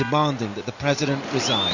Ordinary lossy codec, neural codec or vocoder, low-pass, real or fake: AAC, 48 kbps; none; 7.2 kHz; real